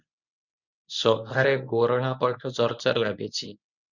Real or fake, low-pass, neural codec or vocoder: fake; 7.2 kHz; codec, 24 kHz, 0.9 kbps, WavTokenizer, medium speech release version 1